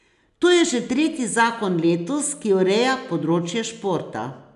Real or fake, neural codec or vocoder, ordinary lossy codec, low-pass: real; none; none; 10.8 kHz